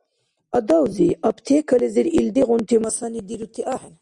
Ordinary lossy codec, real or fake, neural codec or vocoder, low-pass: Opus, 64 kbps; real; none; 10.8 kHz